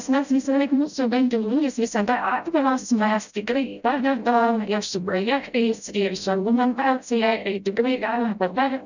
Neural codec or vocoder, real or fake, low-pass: codec, 16 kHz, 0.5 kbps, FreqCodec, smaller model; fake; 7.2 kHz